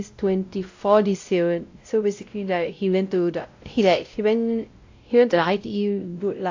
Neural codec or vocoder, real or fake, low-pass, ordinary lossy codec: codec, 16 kHz, 0.5 kbps, X-Codec, WavLM features, trained on Multilingual LibriSpeech; fake; 7.2 kHz; AAC, 48 kbps